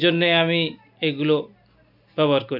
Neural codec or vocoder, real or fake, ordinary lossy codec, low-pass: none; real; none; 5.4 kHz